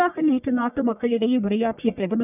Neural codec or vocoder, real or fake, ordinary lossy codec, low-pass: codec, 44.1 kHz, 1.7 kbps, Pupu-Codec; fake; none; 3.6 kHz